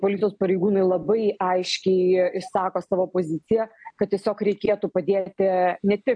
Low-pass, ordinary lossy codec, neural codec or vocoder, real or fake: 9.9 kHz; AAC, 64 kbps; none; real